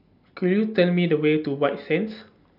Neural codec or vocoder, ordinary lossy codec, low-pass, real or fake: none; none; 5.4 kHz; real